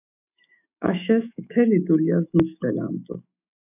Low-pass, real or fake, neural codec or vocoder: 3.6 kHz; fake; autoencoder, 48 kHz, 128 numbers a frame, DAC-VAE, trained on Japanese speech